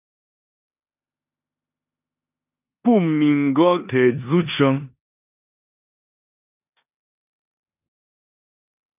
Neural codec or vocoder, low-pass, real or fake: codec, 16 kHz in and 24 kHz out, 0.9 kbps, LongCat-Audio-Codec, four codebook decoder; 3.6 kHz; fake